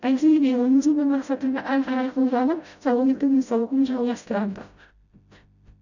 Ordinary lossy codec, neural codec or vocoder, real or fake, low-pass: none; codec, 16 kHz, 0.5 kbps, FreqCodec, smaller model; fake; 7.2 kHz